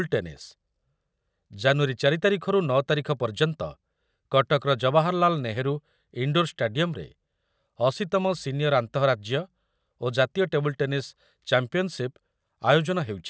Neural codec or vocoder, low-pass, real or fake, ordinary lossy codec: none; none; real; none